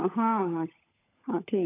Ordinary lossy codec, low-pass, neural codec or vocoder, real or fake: AAC, 24 kbps; 3.6 kHz; codec, 16 kHz, 16 kbps, FunCodec, trained on LibriTTS, 50 frames a second; fake